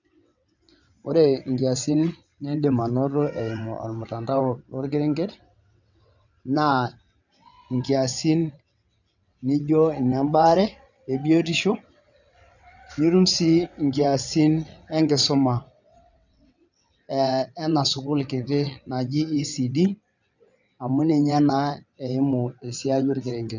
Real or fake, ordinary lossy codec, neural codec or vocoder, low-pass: fake; none; vocoder, 22.05 kHz, 80 mel bands, WaveNeXt; 7.2 kHz